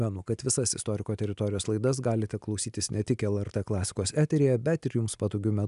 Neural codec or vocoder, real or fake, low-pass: none; real; 10.8 kHz